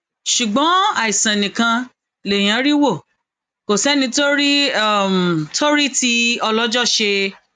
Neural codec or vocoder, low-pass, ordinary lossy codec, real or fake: none; 9.9 kHz; MP3, 96 kbps; real